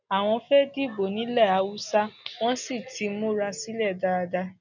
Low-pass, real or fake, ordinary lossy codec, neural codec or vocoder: 7.2 kHz; real; none; none